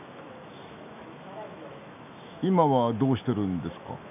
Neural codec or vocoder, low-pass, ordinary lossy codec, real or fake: autoencoder, 48 kHz, 128 numbers a frame, DAC-VAE, trained on Japanese speech; 3.6 kHz; none; fake